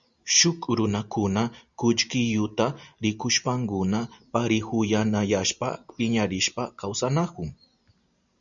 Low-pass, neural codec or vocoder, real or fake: 7.2 kHz; none; real